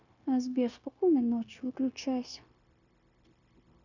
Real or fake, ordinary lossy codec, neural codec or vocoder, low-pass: fake; none; codec, 16 kHz, 0.9 kbps, LongCat-Audio-Codec; 7.2 kHz